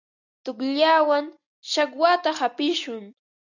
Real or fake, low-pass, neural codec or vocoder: real; 7.2 kHz; none